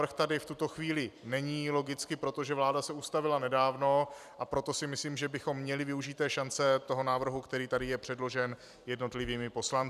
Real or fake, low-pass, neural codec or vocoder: fake; 14.4 kHz; vocoder, 44.1 kHz, 128 mel bands every 256 samples, BigVGAN v2